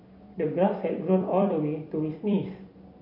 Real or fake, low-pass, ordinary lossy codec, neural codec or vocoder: fake; 5.4 kHz; MP3, 32 kbps; vocoder, 44.1 kHz, 128 mel bands every 256 samples, BigVGAN v2